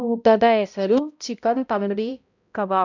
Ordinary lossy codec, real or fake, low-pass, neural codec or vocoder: none; fake; 7.2 kHz; codec, 16 kHz, 0.5 kbps, X-Codec, HuBERT features, trained on balanced general audio